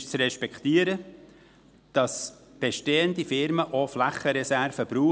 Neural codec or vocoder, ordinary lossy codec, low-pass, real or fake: none; none; none; real